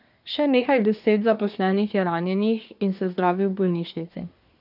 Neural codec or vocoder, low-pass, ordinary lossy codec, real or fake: codec, 24 kHz, 1 kbps, SNAC; 5.4 kHz; none; fake